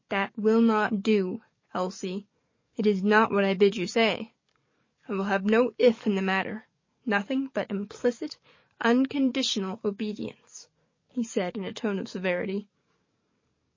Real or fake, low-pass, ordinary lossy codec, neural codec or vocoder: fake; 7.2 kHz; MP3, 32 kbps; codec, 44.1 kHz, 7.8 kbps, DAC